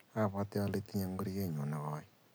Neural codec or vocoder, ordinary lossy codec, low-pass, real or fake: none; none; none; real